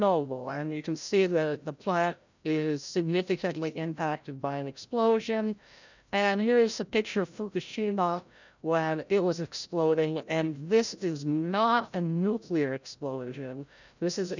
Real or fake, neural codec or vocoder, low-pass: fake; codec, 16 kHz, 0.5 kbps, FreqCodec, larger model; 7.2 kHz